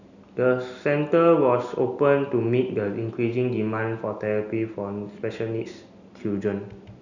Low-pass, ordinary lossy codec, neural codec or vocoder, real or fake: 7.2 kHz; none; none; real